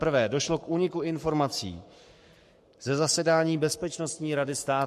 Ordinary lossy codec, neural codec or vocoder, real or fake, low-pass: MP3, 64 kbps; codec, 44.1 kHz, 7.8 kbps, Pupu-Codec; fake; 14.4 kHz